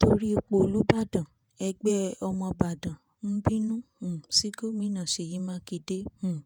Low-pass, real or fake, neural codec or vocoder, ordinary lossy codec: none; fake; vocoder, 48 kHz, 128 mel bands, Vocos; none